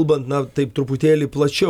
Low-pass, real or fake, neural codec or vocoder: 19.8 kHz; real; none